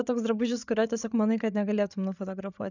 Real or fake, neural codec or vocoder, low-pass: fake; codec, 16 kHz, 8 kbps, FreqCodec, larger model; 7.2 kHz